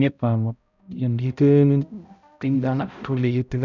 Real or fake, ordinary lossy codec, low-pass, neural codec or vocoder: fake; none; 7.2 kHz; codec, 16 kHz, 0.5 kbps, X-Codec, HuBERT features, trained on balanced general audio